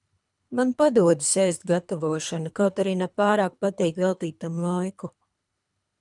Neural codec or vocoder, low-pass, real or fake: codec, 24 kHz, 3 kbps, HILCodec; 10.8 kHz; fake